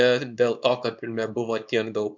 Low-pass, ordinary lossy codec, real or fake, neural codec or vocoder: 7.2 kHz; MP3, 64 kbps; fake; codec, 24 kHz, 0.9 kbps, WavTokenizer, small release